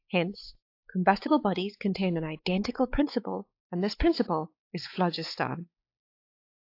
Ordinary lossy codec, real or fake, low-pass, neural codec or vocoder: AAC, 32 kbps; fake; 5.4 kHz; codec, 16 kHz, 4 kbps, X-Codec, WavLM features, trained on Multilingual LibriSpeech